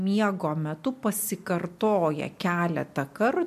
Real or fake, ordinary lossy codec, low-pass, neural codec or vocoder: real; MP3, 64 kbps; 14.4 kHz; none